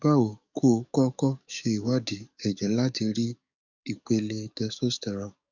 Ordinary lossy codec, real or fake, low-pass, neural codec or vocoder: none; fake; 7.2 kHz; codec, 44.1 kHz, 7.8 kbps, DAC